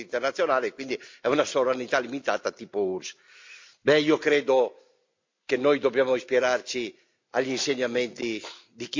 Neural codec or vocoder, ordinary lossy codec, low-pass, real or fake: none; MP3, 64 kbps; 7.2 kHz; real